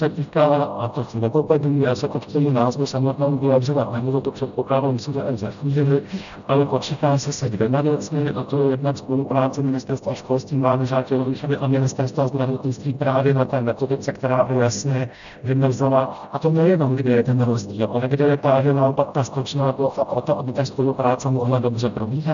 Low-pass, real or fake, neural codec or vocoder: 7.2 kHz; fake; codec, 16 kHz, 0.5 kbps, FreqCodec, smaller model